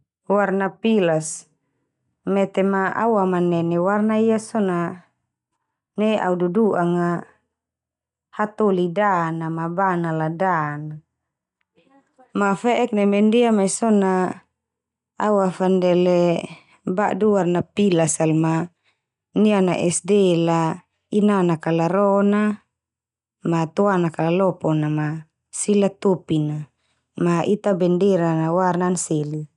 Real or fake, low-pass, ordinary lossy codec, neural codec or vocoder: real; 10.8 kHz; none; none